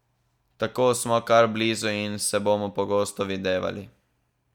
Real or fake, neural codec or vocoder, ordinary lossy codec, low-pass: real; none; none; 19.8 kHz